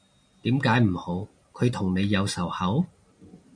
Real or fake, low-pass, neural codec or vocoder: real; 9.9 kHz; none